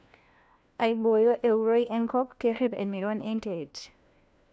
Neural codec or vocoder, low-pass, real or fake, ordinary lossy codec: codec, 16 kHz, 1 kbps, FunCodec, trained on LibriTTS, 50 frames a second; none; fake; none